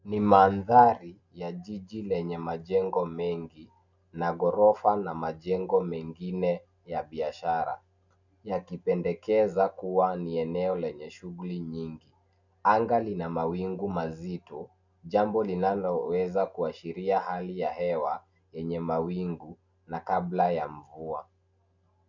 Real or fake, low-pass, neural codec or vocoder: real; 7.2 kHz; none